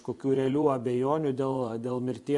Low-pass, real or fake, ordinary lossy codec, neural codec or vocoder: 10.8 kHz; fake; MP3, 64 kbps; vocoder, 24 kHz, 100 mel bands, Vocos